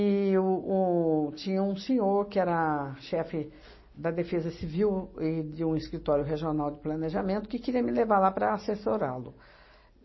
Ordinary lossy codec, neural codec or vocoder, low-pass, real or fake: MP3, 24 kbps; none; 7.2 kHz; real